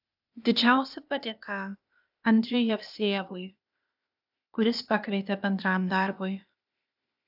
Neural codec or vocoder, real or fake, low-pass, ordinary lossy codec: codec, 16 kHz, 0.8 kbps, ZipCodec; fake; 5.4 kHz; AAC, 48 kbps